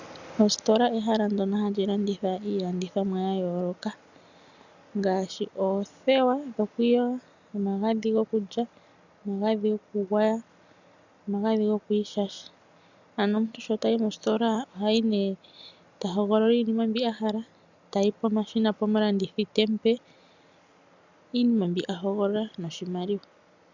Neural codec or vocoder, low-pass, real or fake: none; 7.2 kHz; real